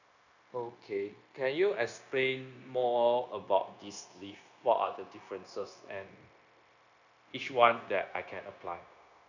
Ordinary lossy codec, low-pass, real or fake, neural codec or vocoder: none; 7.2 kHz; fake; codec, 16 kHz, 0.9 kbps, LongCat-Audio-Codec